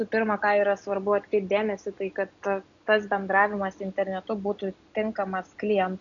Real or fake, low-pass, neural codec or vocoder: real; 7.2 kHz; none